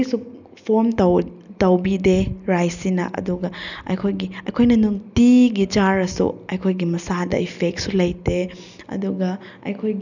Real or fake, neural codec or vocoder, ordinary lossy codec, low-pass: real; none; none; 7.2 kHz